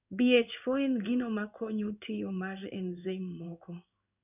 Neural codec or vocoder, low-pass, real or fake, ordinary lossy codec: codec, 16 kHz in and 24 kHz out, 1 kbps, XY-Tokenizer; 3.6 kHz; fake; none